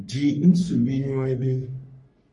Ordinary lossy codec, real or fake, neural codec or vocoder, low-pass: MP3, 48 kbps; fake; codec, 44.1 kHz, 3.4 kbps, Pupu-Codec; 10.8 kHz